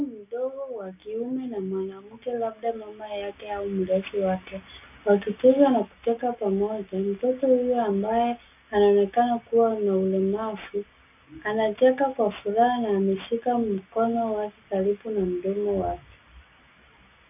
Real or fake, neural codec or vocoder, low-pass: real; none; 3.6 kHz